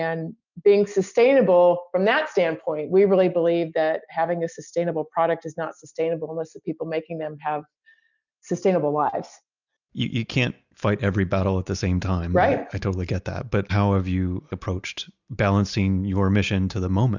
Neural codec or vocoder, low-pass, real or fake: none; 7.2 kHz; real